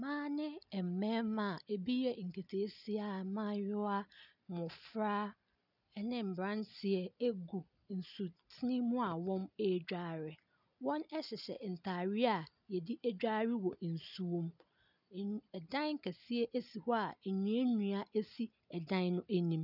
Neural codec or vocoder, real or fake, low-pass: none; real; 5.4 kHz